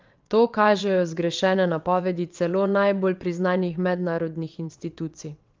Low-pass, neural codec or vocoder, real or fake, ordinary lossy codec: 7.2 kHz; vocoder, 22.05 kHz, 80 mel bands, WaveNeXt; fake; Opus, 24 kbps